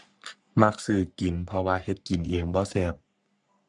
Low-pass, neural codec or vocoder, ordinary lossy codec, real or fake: 10.8 kHz; codec, 44.1 kHz, 3.4 kbps, Pupu-Codec; none; fake